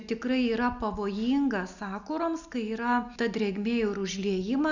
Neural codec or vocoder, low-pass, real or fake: none; 7.2 kHz; real